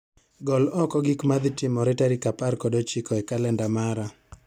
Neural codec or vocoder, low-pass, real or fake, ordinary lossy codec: none; 19.8 kHz; real; none